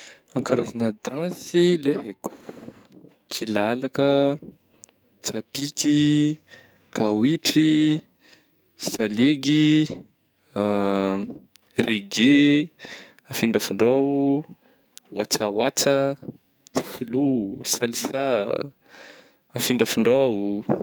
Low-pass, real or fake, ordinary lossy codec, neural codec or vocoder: none; fake; none; codec, 44.1 kHz, 2.6 kbps, SNAC